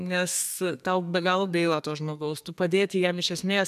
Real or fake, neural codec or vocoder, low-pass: fake; codec, 32 kHz, 1.9 kbps, SNAC; 14.4 kHz